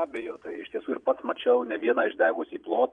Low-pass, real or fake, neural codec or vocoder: 9.9 kHz; fake; vocoder, 22.05 kHz, 80 mel bands, Vocos